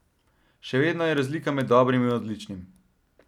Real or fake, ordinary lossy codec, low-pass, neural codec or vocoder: real; none; 19.8 kHz; none